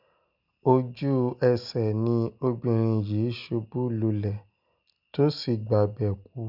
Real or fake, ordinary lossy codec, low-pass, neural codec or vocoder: real; none; 5.4 kHz; none